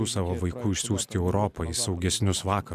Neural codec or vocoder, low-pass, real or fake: none; 14.4 kHz; real